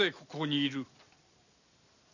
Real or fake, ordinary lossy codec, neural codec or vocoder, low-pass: real; none; none; 7.2 kHz